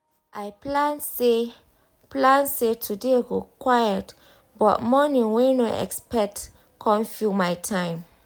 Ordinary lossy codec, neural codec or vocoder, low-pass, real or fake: none; none; none; real